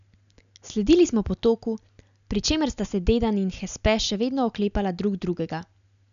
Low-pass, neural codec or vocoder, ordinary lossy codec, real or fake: 7.2 kHz; none; none; real